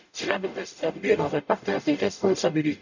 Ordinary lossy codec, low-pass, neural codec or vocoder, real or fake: none; 7.2 kHz; codec, 44.1 kHz, 0.9 kbps, DAC; fake